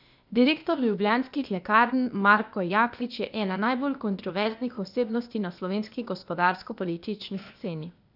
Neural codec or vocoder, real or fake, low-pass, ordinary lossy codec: codec, 16 kHz, 0.8 kbps, ZipCodec; fake; 5.4 kHz; none